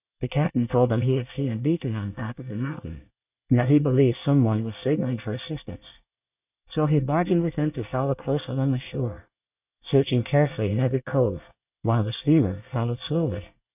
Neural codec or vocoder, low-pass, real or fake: codec, 24 kHz, 1 kbps, SNAC; 3.6 kHz; fake